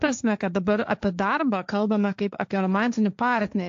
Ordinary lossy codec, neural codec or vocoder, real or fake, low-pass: AAC, 64 kbps; codec, 16 kHz, 1.1 kbps, Voila-Tokenizer; fake; 7.2 kHz